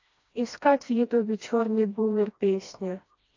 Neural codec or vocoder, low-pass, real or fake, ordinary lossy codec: codec, 16 kHz, 1 kbps, FreqCodec, smaller model; 7.2 kHz; fake; AAC, 48 kbps